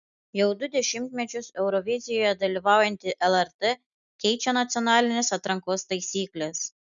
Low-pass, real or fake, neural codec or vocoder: 7.2 kHz; real; none